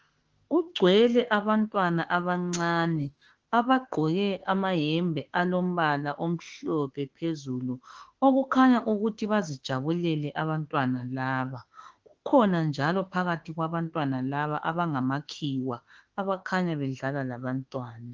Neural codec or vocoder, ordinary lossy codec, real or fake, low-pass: autoencoder, 48 kHz, 32 numbers a frame, DAC-VAE, trained on Japanese speech; Opus, 16 kbps; fake; 7.2 kHz